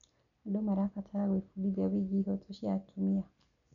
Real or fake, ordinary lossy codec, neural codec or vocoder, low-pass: real; none; none; 7.2 kHz